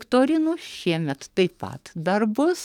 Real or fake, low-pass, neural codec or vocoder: fake; 19.8 kHz; codec, 44.1 kHz, 7.8 kbps, DAC